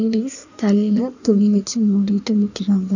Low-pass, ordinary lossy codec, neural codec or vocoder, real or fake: 7.2 kHz; none; codec, 16 kHz in and 24 kHz out, 1.1 kbps, FireRedTTS-2 codec; fake